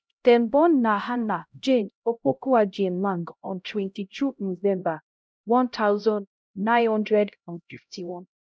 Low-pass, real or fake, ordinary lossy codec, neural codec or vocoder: none; fake; none; codec, 16 kHz, 0.5 kbps, X-Codec, HuBERT features, trained on LibriSpeech